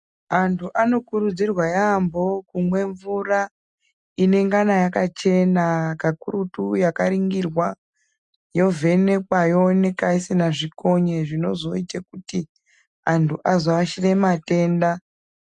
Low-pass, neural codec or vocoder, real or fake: 10.8 kHz; none; real